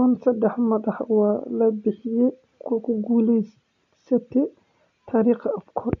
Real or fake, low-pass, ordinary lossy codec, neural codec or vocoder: real; 7.2 kHz; none; none